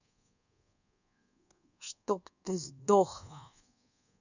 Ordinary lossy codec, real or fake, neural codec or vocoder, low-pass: none; fake; codec, 24 kHz, 1.2 kbps, DualCodec; 7.2 kHz